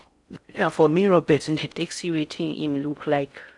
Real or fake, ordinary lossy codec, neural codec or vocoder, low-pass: fake; none; codec, 16 kHz in and 24 kHz out, 0.6 kbps, FocalCodec, streaming, 4096 codes; 10.8 kHz